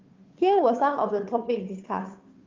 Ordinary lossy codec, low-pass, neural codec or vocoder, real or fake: Opus, 24 kbps; 7.2 kHz; codec, 16 kHz, 2 kbps, FunCodec, trained on Chinese and English, 25 frames a second; fake